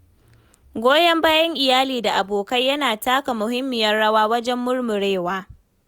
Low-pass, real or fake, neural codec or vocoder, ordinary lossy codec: none; real; none; none